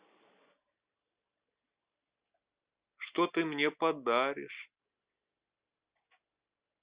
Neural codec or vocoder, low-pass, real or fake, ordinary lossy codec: none; 3.6 kHz; real; Opus, 64 kbps